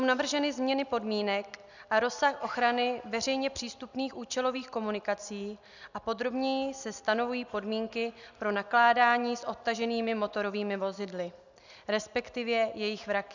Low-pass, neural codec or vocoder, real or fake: 7.2 kHz; none; real